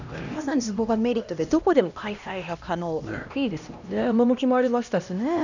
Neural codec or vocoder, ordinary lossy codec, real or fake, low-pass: codec, 16 kHz, 1 kbps, X-Codec, HuBERT features, trained on LibriSpeech; none; fake; 7.2 kHz